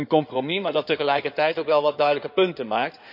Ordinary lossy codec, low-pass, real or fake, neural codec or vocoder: none; 5.4 kHz; fake; codec, 16 kHz in and 24 kHz out, 2.2 kbps, FireRedTTS-2 codec